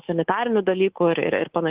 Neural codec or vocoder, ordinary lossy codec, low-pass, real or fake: codec, 24 kHz, 3.1 kbps, DualCodec; Opus, 16 kbps; 3.6 kHz; fake